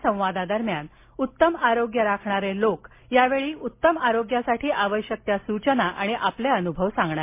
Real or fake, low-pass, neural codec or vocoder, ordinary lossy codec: real; 3.6 kHz; none; MP3, 24 kbps